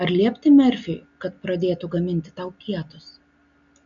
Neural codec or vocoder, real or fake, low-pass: none; real; 7.2 kHz